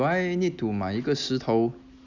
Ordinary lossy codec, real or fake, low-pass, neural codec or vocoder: Opus, 64 kbps; real; 7.2 kHz; none